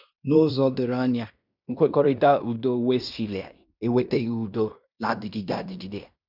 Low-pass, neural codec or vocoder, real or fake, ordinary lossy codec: 5.4 kHz; codec, 16 kHz in and 24 kHz out, 0.9 kbps, LongCat-Audio-Codec, fine tuned four codebook decoder; fake; none